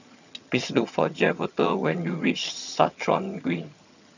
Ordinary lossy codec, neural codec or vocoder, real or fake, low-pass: none; vocoder, 22.05 kHz, 80 mel bands, HiFi-GAN; fake; 7.2 kHz